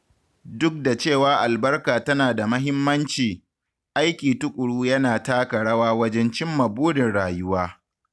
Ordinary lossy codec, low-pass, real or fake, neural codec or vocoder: none; none; real; none